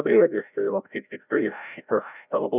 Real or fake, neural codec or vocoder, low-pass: fake; codec, 16 kHz, 0.5 kbps, FreqCodec, larger model; 3.6 kHz